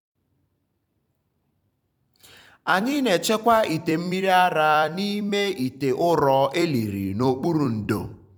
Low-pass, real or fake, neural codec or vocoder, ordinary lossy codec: 19.8 kHz; fake; vocoder, 44.1 kHz, 128 mel bands every 256 samples, BigVGAN v2; none